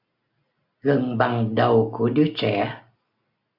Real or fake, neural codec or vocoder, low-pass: real; none; 5.4 kHz